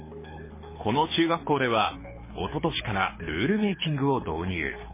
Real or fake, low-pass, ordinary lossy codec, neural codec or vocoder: fake; 3.6 kHz; MP3, 16 kbps; codec, 24 kHz, 6 kbps, HILCodec